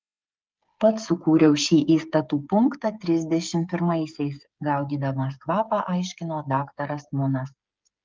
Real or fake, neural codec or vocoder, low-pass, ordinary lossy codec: fake; codec, 16 kHz, 8 kbps, FreqCodec, smaller model; 7.2 kHz; Opus, 32 kbps